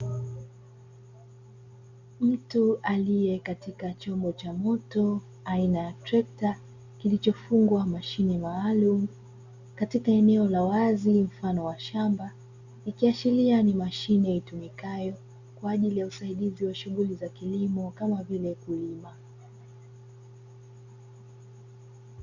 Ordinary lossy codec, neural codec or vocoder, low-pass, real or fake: Opus, 64 kbps; none; 7.2 kHz; real